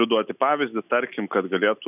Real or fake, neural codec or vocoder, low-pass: real; none; 3.6 kHz